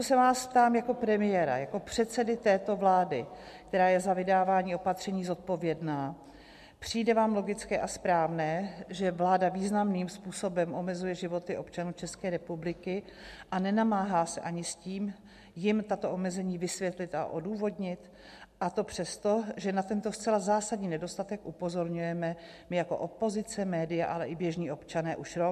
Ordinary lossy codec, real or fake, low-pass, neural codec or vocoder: MP3, 64 kbps; real; 14.4 kHz; none